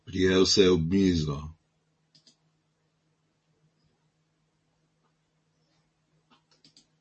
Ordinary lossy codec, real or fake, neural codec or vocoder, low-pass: MP3, 32 kbps; real; none; 10.8 kHz